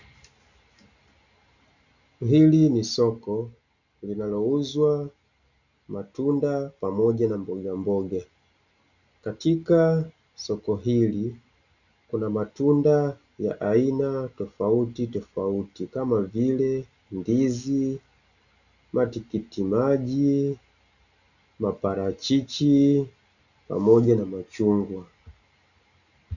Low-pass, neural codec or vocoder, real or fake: 7.2 kHz; none; real